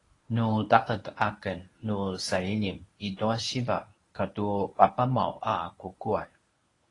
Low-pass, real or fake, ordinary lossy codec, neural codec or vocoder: 10.8 kHz; fake; AAC, 32 kbps; codec, 24 kHz, 0.9 kbps, WavTokenizer, medium speech release version 1